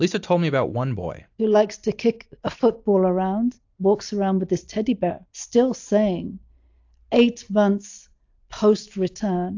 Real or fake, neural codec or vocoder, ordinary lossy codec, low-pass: real; none; AAC, 48 kbps; 7.2 kHz